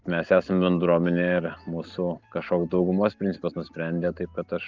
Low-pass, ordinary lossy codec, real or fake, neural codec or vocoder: 7.2 kHz; Opus, 24 kbps; real; none